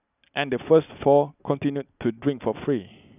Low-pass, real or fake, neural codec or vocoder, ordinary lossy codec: 3.6 kHz; real; none; none